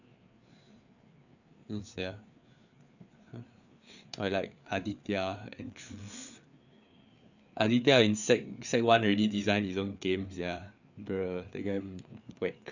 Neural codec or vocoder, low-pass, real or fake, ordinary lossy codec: codec, 16 kHz, 4 kbps, FreqCodec, larger model; 7.2 kHz; fake; none